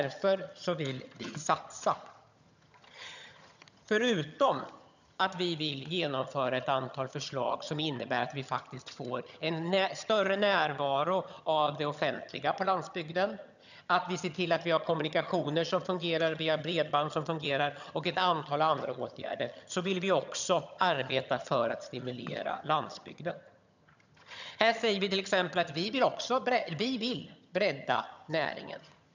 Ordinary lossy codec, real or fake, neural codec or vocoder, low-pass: none; fake; vocoder, 22.05 kHz, 80 mel bands, HiFi-GAN; 7.2 kHz